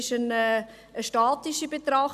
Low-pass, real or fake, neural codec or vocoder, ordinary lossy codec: 14.4 kHz; real; none; none